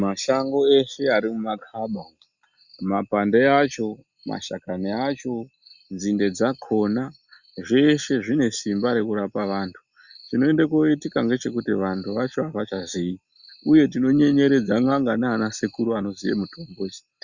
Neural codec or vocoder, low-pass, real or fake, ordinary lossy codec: none; 7.2 kHz; real; Opus, 64 kbps